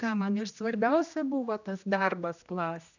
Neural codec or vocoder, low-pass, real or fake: codec, 16 kHz, 1 kbps, X-Codec, HuBERT features, trained on general audio; 7.2 kHz; fake